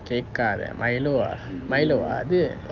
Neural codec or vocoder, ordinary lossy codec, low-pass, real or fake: none; Opus, 24 kbps; 7.2 kHz; real